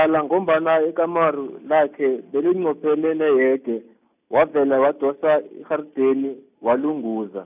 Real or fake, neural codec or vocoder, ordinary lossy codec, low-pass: real; none; none; 3.6 kHz